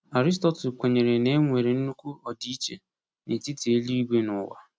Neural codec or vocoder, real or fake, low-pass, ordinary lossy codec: none; real; none; none